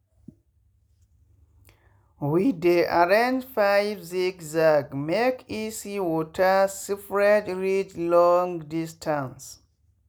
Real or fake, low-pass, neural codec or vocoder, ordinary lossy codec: real; none; none; none